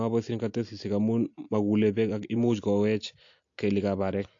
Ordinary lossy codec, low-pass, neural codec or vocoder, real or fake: AAC, 48 kbps; 7.2 kHz; none; real